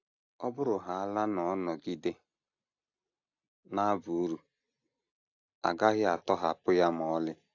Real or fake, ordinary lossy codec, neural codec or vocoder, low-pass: real; AAC, 48 kbps; none; 7.2 kHz